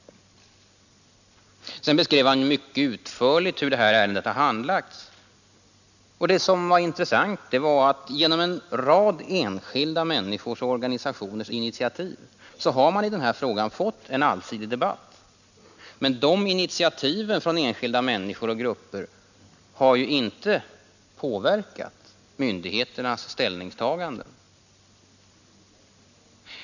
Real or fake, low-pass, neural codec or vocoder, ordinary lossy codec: real; 7.2 kHz; none; none